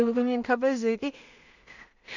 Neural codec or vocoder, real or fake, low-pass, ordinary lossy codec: codec, 16 kHz in and 24 kHz out, 0.4 kbps, LongCat-Audio-Codec, two codebook decoder; fake; 7.2 kHz; none